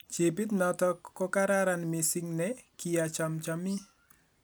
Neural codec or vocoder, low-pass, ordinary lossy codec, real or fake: none; none; none; real